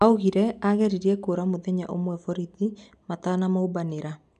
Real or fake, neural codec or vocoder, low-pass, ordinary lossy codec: real; none; 10.8 kHz; none